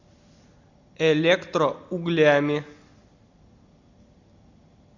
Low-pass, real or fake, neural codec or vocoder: 7.2 kHz; real; none